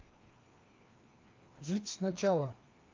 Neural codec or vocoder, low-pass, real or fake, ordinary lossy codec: codec, 16 kHz, 2 kbps, FreqCodec, larger model; 7.2 kHz; fake; Opus, 24 kbps